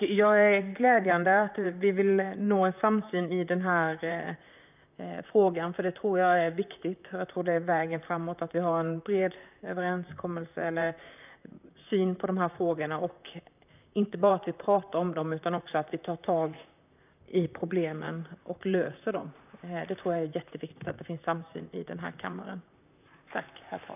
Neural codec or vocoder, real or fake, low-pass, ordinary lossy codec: vocoder, 44.1 kHz, 128 mel bands, Pupu-Vocoder; fake; 3.6 kHz; none